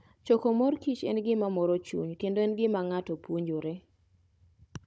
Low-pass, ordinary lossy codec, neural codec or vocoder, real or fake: none; none; codec, 16 kHz, 16 kbps, FunCodec, trained on Chinese and English, 50 frames a second; fake